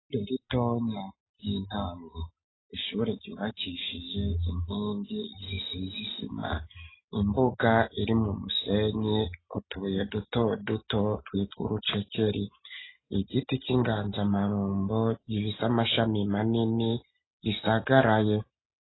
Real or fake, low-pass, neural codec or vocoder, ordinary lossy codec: real; 7.2 kHz; none; AAC, 16 kbps